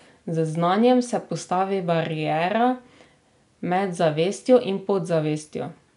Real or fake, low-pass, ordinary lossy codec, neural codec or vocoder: real; 10.8 kHz; none; none